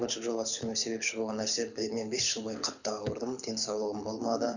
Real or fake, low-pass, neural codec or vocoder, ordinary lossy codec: fake; 7.2 kHz; codec, 24 kHz, 6 kbps, HILCodec; none